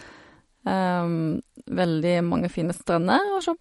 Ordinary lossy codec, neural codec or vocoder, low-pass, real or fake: MP3, 48 kbps; none; 19.8 kHz; real